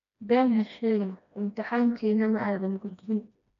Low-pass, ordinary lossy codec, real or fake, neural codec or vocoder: 7.2 kHz; none; fake; codec, 16 kHz, 1 kbps, FreqCodec, smaller model